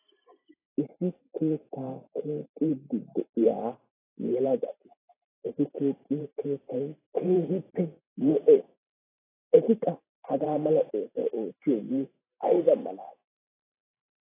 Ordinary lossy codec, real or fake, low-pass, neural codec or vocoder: AAC, 16 kbps; fake; 3.6 kHz; vocoder, 44.1 kHz, 128 mel bands every 512 samples, BigVGAN v2